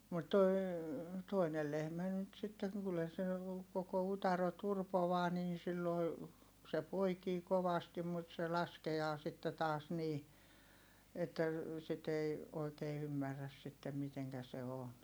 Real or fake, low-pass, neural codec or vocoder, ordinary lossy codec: real; none; none; none